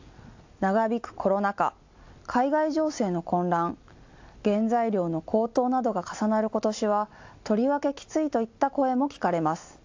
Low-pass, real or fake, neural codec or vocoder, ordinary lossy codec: 7.2 kHz; real; none; AAC, 48 kbps